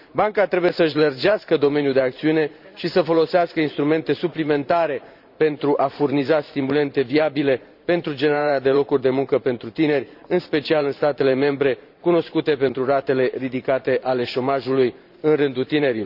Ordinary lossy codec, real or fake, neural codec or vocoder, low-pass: none; fake; vocoder, 44.1 kHz, 128 mel bands every 512 samples, BigVGAN v2; 5.4 kHz